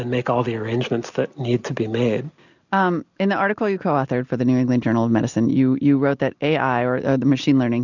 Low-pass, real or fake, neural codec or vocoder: 7.2 kHz; real; none